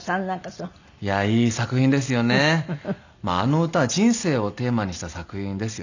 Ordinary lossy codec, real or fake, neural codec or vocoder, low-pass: none; real; none; 7.2 kHz